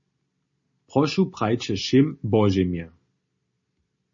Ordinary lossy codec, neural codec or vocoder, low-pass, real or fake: MP3, 32 kbps; none; 7.2 kHz; real